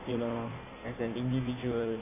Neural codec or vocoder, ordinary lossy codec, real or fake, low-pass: codec, 16 kHz in and 24 kHz out, 1.1 kbps, FireRedTTS-2 codec; none; fake; 3.6 kHz